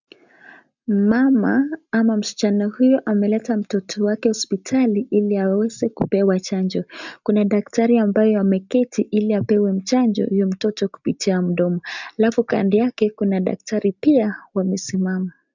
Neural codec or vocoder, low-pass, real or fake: none; 7.2 kHz; real